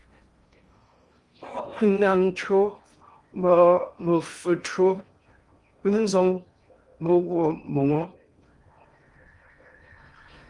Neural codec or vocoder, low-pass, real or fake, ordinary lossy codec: codec, 16 kHz in and 24 kHz out, 0.6 kbps, FocalCodec, streaming, 4096 codes; 10.8 kHz; fake; Opus, 32 kbps